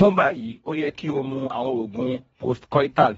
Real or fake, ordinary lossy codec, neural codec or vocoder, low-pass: fake; AAC, 24 kbps; codec, 24 kHz, 1.5 kbps, HILCodec; 10.8 kHz